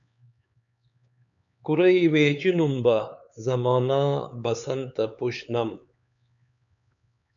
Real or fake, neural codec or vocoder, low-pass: fake; codec, 16 kHz, 4 kbps, X-Codec, HuBERT features, trained on LibriSpeech; 7.2 kHz